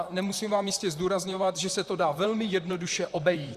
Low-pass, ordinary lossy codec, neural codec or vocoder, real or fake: 14.4 kHz; Opus, 64 kbps; vocoder, 44.1 kHz, 128 mel bands, Pupu-Vocoder; fake